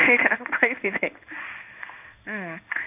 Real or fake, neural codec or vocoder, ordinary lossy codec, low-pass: fake; codec, 16 kHz in and 24 kHz out, 1 kbps, XY-Tokenizer; none; 3.6 kHz